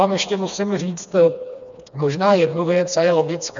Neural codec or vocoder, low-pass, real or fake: codec, 16 kHz, 2 kbps, FreqCodec, smaller model; 7.2 kHz; fake